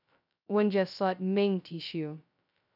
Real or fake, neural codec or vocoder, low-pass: fake; codec, 16 kHz, 0.2 kbps, FocalCodec; 5.4 kHz